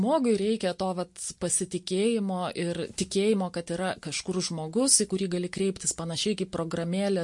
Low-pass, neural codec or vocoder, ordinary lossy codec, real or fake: 10.8 kHz; none; MP3, 48 kbps; real